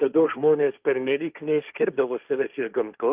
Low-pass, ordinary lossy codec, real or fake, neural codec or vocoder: 3.6 kHz; Opus, 24 kbps; fake; codec, 16 kHz, 1.1 kbps, Voila-Tokenizer